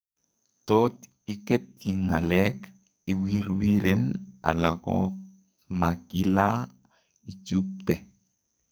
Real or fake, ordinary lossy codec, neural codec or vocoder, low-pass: fake; none; codec, 44.1 kHz, 2.6 kbps, SNAC; none